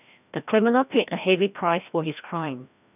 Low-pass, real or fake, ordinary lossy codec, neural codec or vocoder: 3.6 kHz; fake; none; codec, 16 kHz, 1 kbps, FreqCodec, larger model